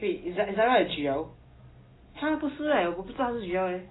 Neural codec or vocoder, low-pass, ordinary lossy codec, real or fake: none; 7.2 kHz; AAC, 16 kbps; real